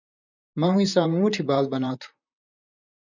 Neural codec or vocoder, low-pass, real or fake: vocoder, 44.1 kHz, 128 mel bands, Pupu-Vocoder; 7.2 kHz; fake